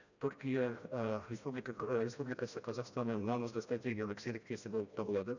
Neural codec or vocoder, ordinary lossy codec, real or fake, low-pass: codec, 16 kHz, 1 kbps, FreqCodec, smaller model; none; fake; 7.2 kHz